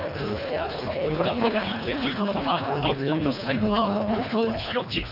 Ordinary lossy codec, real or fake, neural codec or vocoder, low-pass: none; fake; codec, 24 kHz, 1.5 kbps, HILCodec; 5.4 kHz